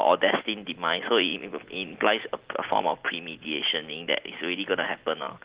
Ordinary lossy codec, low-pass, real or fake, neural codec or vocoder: Opus, 16 kbps; 3.6 kHz; real; none